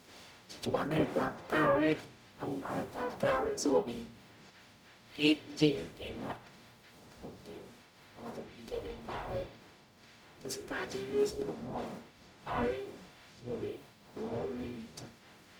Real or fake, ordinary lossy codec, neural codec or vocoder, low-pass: fake; none; codec, 44.1 kHz, 0.9 kbps, DAC; none